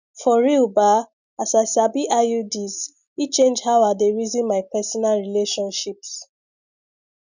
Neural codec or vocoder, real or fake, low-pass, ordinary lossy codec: none; real; 7.2 kHz; none